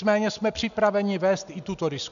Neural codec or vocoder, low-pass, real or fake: none; 7.2 kHz; real